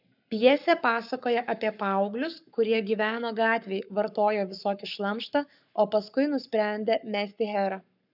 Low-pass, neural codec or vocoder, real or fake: 5.4 kHz; codec, 44.1 kHz, 7.8 kbps, Pupu-Codec; fake